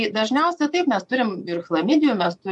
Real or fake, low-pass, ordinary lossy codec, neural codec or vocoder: fake; 10.8 kHz; MP3, 64 kbps; vocoder, 24 kHz, 100 mel bands, Vocos